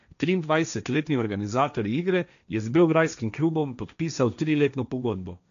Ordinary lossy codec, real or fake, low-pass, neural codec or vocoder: none; fake; 7.2 kHz; codec, 16 kHz, 1.1 kbps, Voila-Tokenizer